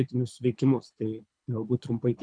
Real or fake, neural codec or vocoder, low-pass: fake; codec, 24 kHz, 6 kbps, HILCodec; 9.9 kHz